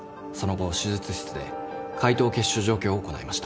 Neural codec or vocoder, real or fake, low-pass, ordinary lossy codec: none; real; none; none